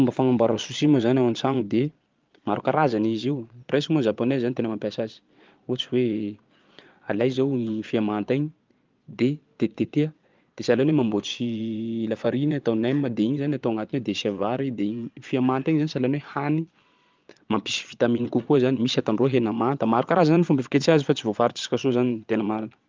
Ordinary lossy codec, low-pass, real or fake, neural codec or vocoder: Opus, 32 kbps; 7.2 kHz; fake; vocoder, 22.05 kHz, 80 mel bands, Vocos